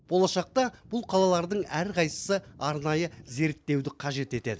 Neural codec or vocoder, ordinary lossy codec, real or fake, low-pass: none; none; real; none